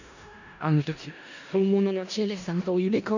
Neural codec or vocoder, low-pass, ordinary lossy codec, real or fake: codec, 16 kHz in and 24 kHz out, 0.4 kbps, LongCat-Audio-Codec, four codebook decoder; 7.2 kHz; none; fake